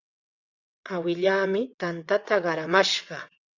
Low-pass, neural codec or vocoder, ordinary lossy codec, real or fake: 7.2 kHz; vocoder, 22.05 kHz, 80 mel bands, WaveNeXt; Opus, 64 kbps; fake